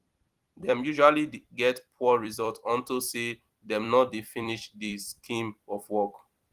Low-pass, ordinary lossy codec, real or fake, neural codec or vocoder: 14.4 kHz; Opus, 24 kbps; fake; vocoder, 44.1 kHz, 128 mel bands every 256 samples, BigVGAN v2